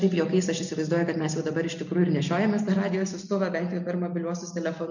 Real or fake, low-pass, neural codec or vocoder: real; 7.2 kHz; none